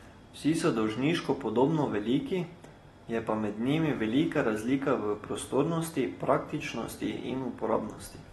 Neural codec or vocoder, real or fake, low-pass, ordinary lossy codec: none; real; 19.8 kHz; AAC, 32 kbps